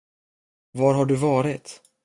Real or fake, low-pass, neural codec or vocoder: real; 10.8 kHz; none